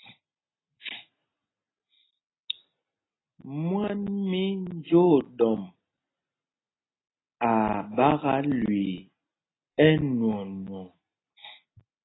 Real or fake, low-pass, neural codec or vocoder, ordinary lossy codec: real; 7.2 kHz; none; AAC, 16 kbps